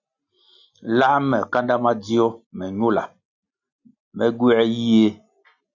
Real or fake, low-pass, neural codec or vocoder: real; 7.2 kHz; none